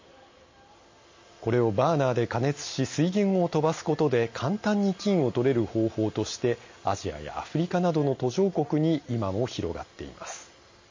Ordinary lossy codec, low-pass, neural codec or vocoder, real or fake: MP3, 32 kbps; 7.2 kHz; none; real